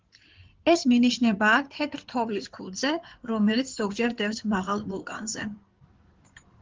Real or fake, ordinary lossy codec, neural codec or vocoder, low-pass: fake; Opus, 16 kbps; codec, 16 kHz in and 24 kHz out, 2.2 kbps, FireRedTTS-2 codec; 7.2 kHz